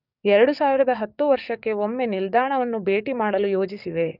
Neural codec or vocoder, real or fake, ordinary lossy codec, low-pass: codec, 44.1 kHz, 7.8 kbps, DAC; fake; none; 5.4 kHz